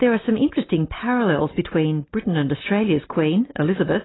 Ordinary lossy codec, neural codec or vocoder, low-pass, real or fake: AAC, 16 kbps; none; 7.2 kHz; real